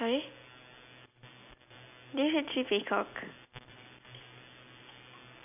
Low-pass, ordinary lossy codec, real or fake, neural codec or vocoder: 3.6 kHz; none; real; none